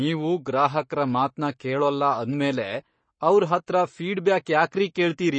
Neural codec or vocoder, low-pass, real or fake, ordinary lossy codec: codec, 44.1 kHz, 7.8 kbps, Pupu-Codec; 9.9 kHz; fake; MP3, 32 kbps